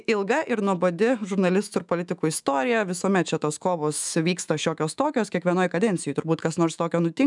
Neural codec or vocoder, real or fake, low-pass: autoencoder, 48 kHz, 128 numbers a frame, DAC-VAE, trained on Japanese speech; fake; 10.8 kHz